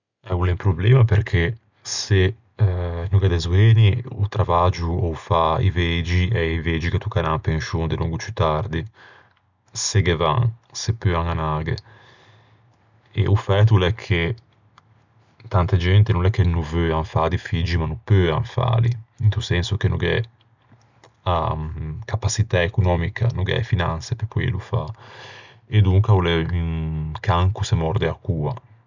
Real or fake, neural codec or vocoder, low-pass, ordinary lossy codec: real; none; 7.2 kHz; none